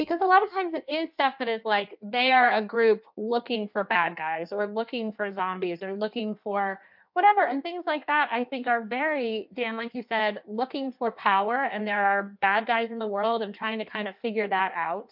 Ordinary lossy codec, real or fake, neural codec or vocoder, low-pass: MP3, 48 kbps; fake; codec, 16 kHz in and 24 kHz out, 1.1 kbps, FireRedTTS-2 codec; 5.4 kHz